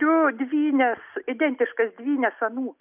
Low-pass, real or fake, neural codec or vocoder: 3.6 kHz; real; none